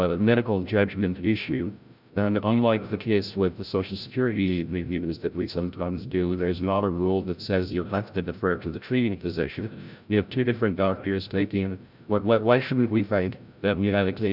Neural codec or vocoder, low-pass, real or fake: codec, 16 kHz, 0.5 kbps, FreqCodec, larger model; 5.4 kHz; fake